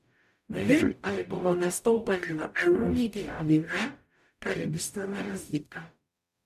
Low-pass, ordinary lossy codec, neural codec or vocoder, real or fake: 14.4 kHz; none; codec, 44.1 kHz, 0.9 kbps, DAC; fake